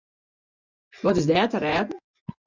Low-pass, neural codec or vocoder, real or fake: 7.2 kHz; vocoder, 24 kHz, 100 mel bands, Vocos; fake